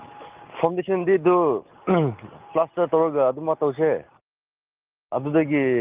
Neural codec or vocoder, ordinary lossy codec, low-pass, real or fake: none; Opus, 32 kbps; 3.6 kHz; real